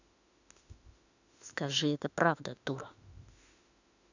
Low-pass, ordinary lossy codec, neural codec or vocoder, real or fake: 7.2 kHz; none; autoencoder, 48 kHz, 32 numbers a frame, DAC-VAE, trained on Japanese speech; fake